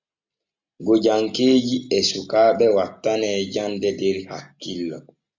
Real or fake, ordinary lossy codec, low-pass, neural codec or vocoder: real; MP3, 64 kbps; 7.2 kHz; none